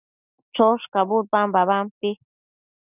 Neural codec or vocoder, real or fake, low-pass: none; real; 3.6 kHz